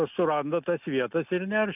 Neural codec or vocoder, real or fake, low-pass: none; real; 3.6 kHz